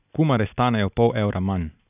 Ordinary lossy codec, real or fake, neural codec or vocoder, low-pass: none; real; none; 3.6 kHz